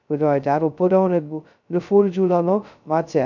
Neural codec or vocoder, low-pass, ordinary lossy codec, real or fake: codec, 16 kHz, 0.2 kbps, FocalCodec; 7.2 kHz; none; fake